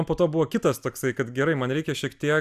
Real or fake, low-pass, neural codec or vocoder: real; 14.4 kHz; none